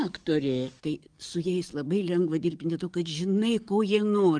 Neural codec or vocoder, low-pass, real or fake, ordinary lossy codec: codec, 44.1 kHz, 7.8 kbps, DAC; 9.9 kHz; fake; Opus, 32 kbps